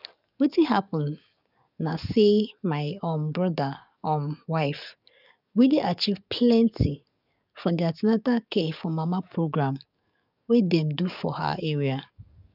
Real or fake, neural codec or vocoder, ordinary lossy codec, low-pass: fake; codec, 44.1 kHz, 7.8 kbps, DAC; none; 5.4 kHz